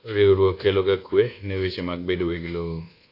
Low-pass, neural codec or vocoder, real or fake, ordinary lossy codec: 5.4 kHz; codec, 24 kHz, 1.2 kbps, DualCodec; fake; AAC, 32 kbps